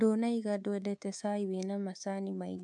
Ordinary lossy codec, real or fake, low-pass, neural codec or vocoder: AAC, 64 kbps; fake; 10.8 kHz; codec, 24 kHz, 3.1 kbps, DualCodec